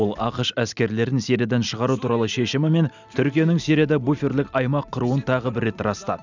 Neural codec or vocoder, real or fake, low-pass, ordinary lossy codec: none; real; 7.2 kHz; none